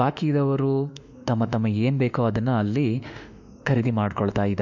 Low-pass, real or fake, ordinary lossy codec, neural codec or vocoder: 7.2 kHz; fake; none; autoencoder, 48 kHz, 32 numbers a frame, DAC-VAE, trained on Japanese speech